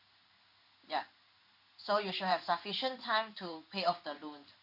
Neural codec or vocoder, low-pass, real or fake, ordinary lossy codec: codec, 16 kHz in and 24 kHz out, 1 kbps, XY-Tokenizer; 5.4 kHz; fake; Opus, 64 kbps